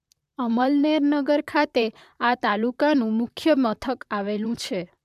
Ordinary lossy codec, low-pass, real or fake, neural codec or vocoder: MP3, 96 kbps; 14.4 kHz; fake; vocoder, 44.1 kHz, 128 mel bands, Pupu-Vocoder